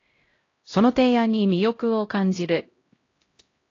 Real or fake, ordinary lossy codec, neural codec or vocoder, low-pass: fake; AAC, 32 kbps; codec, 16 kHz, 0.5 kbps, X-Codec, HuBERT features, trained on LibriSpeech; 7.2 kHz